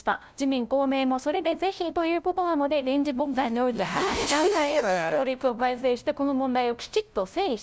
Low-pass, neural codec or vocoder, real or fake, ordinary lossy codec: none; codec, 16 kHz, 0.5 kbps, FunCodec, trained on LibriTTS, 25 frames a second; fake; none